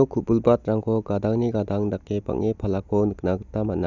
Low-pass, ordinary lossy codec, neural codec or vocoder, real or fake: 7.2 kHz; none; none; real